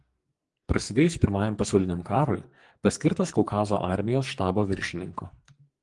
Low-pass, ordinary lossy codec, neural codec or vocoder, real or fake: 10.8 kHz; Opus, 24 kbps; codec, 44.1 kHz, 2.6 kbps, SNAC; fake